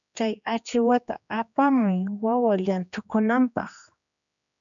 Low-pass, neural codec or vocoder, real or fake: 7.2 kHz; codec, 16 kHz, 2 kbps, X-Codec, HuBERT features, trained on general audio; fake